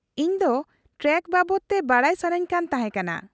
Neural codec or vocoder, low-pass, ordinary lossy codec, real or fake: none; none; none; real